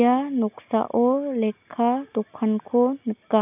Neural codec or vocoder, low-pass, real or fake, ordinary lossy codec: none; 3.6 kHz; real; none